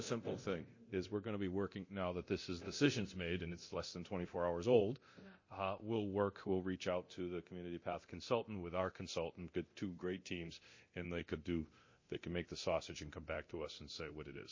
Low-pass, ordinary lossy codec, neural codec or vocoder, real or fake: 7.2 kHz; MP3, 32 kbps; codec, 24 kHz, 0.9 kbps, DualCodec; fake